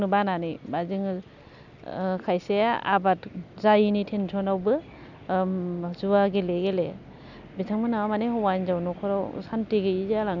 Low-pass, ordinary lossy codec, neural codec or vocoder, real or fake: 7.2 kHz; none; none; real